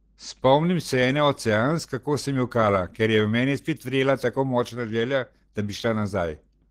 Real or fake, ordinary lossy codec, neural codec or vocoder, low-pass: real; Opus, 16 kbps; none; 14.4 kHz